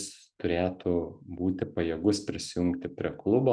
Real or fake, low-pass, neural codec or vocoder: real; 9.9 kHz; none